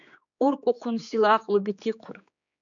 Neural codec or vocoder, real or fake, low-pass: codec, 16 kHz, 4 kbps, X-Codec, HuBERT features, trained on general audio; fake; 7.2 kHz